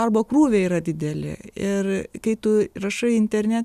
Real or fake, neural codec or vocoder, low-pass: real; none; 14.4 kHz